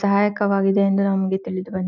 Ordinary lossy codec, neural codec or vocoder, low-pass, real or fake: none; none; 7.2 kHz; real